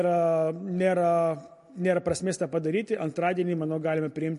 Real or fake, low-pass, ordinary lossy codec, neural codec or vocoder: real; 10.8 kHz; MP3, 48 kbps; none